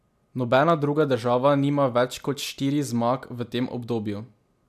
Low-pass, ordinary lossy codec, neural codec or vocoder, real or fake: 14.4 kHz; MP3, 96 kbps; none; real